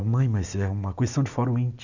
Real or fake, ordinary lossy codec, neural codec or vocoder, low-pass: real; none; none; 7.2 kHz